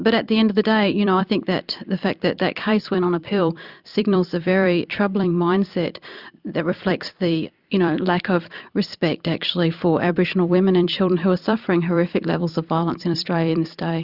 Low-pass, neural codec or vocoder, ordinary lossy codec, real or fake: 5.4 kHz; vocoder, 44.1 kHz, 128 mel bands every 256 samples, BigVGAN v2; Opus, 64 kbps; fake